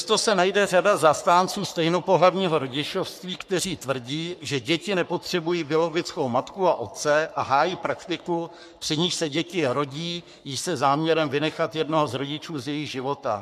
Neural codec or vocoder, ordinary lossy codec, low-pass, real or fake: codec, 44.1 kHz, 3.4 kbps, Pupu-Codec; MP3, 96 kbps; 14.4 kHz; fake